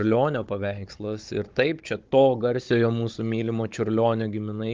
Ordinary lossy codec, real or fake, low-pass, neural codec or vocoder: Opus, 32 kbps; fake; 7.2 kHz; codec, 16 kHz, 16 kbps, FunCodec, trained on Chinese and English, 50 frames a second